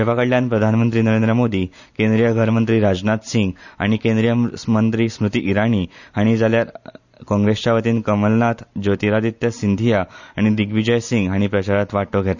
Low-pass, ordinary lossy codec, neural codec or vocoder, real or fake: 7.2 kHz; none; none; real